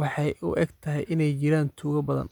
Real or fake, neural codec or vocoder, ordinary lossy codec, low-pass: fake; vocoder, 44.1 kHz, 128 mel bands every 256 samples, BigVGAN v2; none; 19.8 kHz